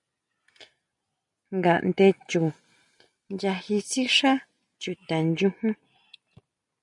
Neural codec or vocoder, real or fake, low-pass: none; real; 10.8 kHz